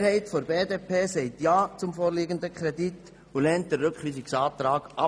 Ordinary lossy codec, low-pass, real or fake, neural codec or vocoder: none; none; real; none